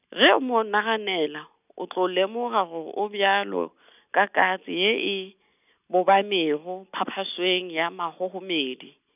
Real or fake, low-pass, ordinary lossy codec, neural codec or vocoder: real; 3.6 kHz; none; none